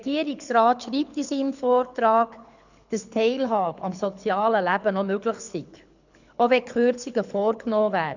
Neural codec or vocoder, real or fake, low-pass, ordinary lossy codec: codec, 24 kHz, 6 kbps, HILCodec; fake; 7.2 kHz; none